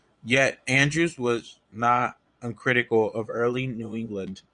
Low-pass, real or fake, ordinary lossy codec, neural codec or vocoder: 9.9 kHz; fake; Opus, 64 kbps; vocoder, 22.05 kHz, 80 mel bands, Vocos